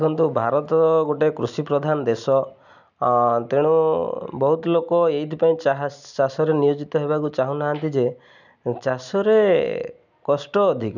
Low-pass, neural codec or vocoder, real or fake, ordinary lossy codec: 7.2 kHz; none; real; none